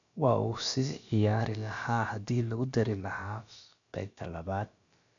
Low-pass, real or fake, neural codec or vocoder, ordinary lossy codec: 7.2 kHz; fake; codec, 16 kHz, about 1 kbps, DyCAST, with the encoder's durations; MP3, 96 kbps